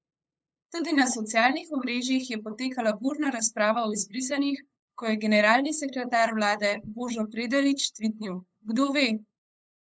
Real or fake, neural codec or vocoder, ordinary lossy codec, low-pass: fake; codec, 16 kHz, 8 kbps, FunCodec, trained on LibriTTS, 25 frames a second; none; none